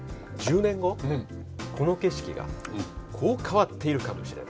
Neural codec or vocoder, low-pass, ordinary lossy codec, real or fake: none; none; none; real